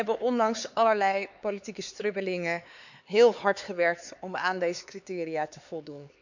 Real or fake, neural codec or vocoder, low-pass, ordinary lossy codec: fake; codec, 16 kHz, 4 kbps, X-Codec, HuBERT features, trained on LibriSpeech; 7.2 kHz; none